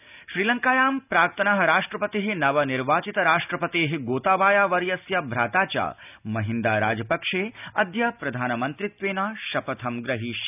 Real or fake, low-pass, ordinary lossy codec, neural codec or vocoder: real; 3.6 kHz; none; none